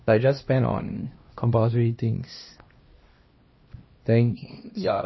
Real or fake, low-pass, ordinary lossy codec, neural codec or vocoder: fake; 7.2 kHz; MP3, 24 kbps; codec, 16 kHz, 1 kbps, X-Codec, HuBERT features, trained on LibriSpeech